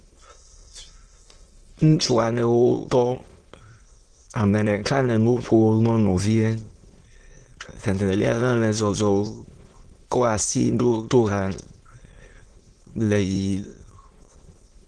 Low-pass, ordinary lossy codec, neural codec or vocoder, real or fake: 9.9 kHz; Opus, 16 kbps; autoencoder, 22.05 kHz, a latent of 192 numbers a frame, VITS, trained on many speakers; fake